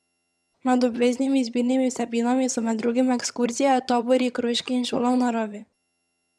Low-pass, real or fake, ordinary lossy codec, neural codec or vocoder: none; fake; none; vocoder, 22.05 kHz, 80 mel bands, HiFi-GAN